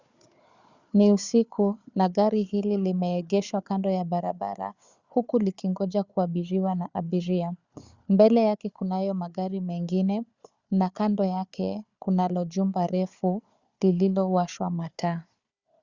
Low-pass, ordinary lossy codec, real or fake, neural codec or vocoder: 7.2 kHz; Opus, 64 kbps; fake; codec, 16 kHz, 4 kbps, FunCodec, trained on Chinese and English, 50 frames a second